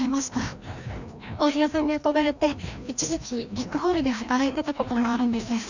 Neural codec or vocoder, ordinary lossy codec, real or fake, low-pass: codec, 16 kHz, 1 kbps, FreqCodec, larger model; none; fake; 7.2 kHz